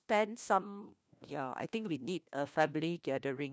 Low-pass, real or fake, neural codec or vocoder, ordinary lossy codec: none; fake; codec, 16 kHz, 1 kbps, FunCodec, trained on LibriTTS, 50 frames a second; none